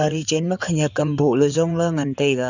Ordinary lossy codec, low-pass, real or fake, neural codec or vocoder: none; 7.2 kHz; fake; codec, 16 kHz in and 24 kHz out, 2.2 kbps, FireRedTTS-2 codec